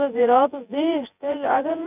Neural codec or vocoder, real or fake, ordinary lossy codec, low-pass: vocoder, 24 kHz, 100 mel bands, Vocos; fake; none; 3.6 kHz